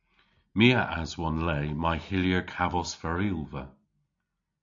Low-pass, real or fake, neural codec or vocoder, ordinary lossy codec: 7.2 kHz; real; none; AAC, 64 kbps